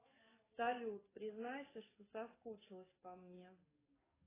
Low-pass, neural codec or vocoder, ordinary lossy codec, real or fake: 3.6 kHz; codec, 44.1 kHz, 7.8 kbps, DAC; AAC, 16 kbps; fake